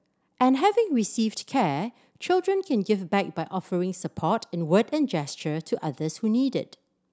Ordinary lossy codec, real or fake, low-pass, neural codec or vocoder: none; real; none; none